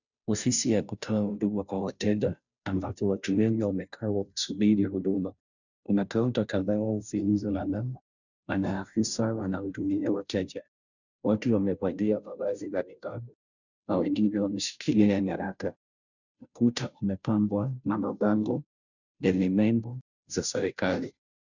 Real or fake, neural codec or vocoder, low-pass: fake; codec, 16 kHz, 0.5 kbps, FunCodec, trained on Chinese and English, 25 frames a second; 7.2 kHz